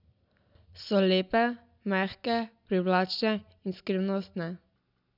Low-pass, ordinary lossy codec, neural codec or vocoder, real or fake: 5.4 kHz; none; none; real